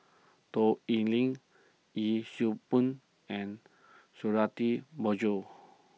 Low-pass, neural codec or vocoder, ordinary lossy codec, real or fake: none; none; none; real